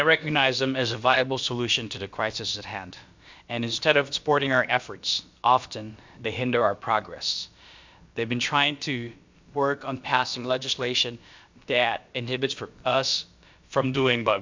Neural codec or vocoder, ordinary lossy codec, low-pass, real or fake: codec, 16 kHz, about 1 kbps, DyCAST, with the encoder's durations; MP3, 64 kbps; 7.2 kHz; fake